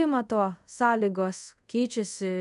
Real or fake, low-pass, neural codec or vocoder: fake; 10.8 kHz; codec, 24 kHz, 0.5 kbps, DualCodec